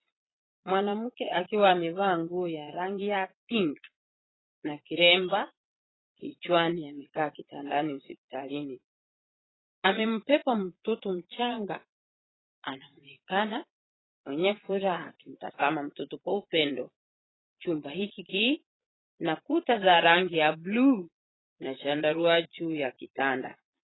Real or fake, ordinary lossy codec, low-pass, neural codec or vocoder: fake; AAC, 16 kbps; 7.2 kHz; vocoder, 22.05 kHz, 80 mel bands, Vocos